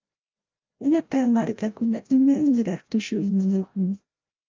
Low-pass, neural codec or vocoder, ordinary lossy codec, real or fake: 7.2 kHz; codec, 16 kHz, 0.5 kbps, FreqCodec, larger model; Opus, 24 kbps; fake